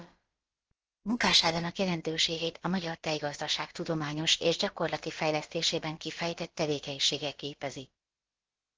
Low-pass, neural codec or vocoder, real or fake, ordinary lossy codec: 7.2 kHz; codec, 16 kHz, about 1 kbps, DyCAST, with the encoder's durations; fake; Opus, 16 kbps